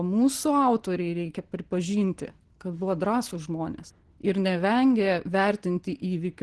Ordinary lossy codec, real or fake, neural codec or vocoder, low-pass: Opus, 16 kbps; real; none; 10.8 kHz